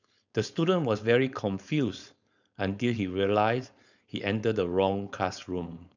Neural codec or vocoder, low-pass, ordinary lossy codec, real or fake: codec, 16 kHz, 4.8 kbps, FACodec; 7.2 kHz; none; fake